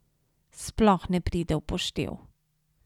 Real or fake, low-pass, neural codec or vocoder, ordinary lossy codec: real; 19.8 kHz; none; none